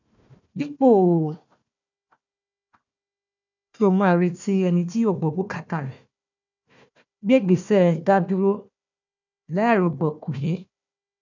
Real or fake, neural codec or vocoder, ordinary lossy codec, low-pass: fake; codec, 16 kHz, 1 kbps, FunCodec, trained on Chinese and English, 50 frames a second; none; 7.2 kHz